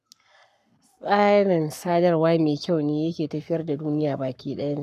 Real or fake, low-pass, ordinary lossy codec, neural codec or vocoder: fake; 14.4 kHz; none; codec, 44.1 kHz, 7.8 kbps, Pupu-Codec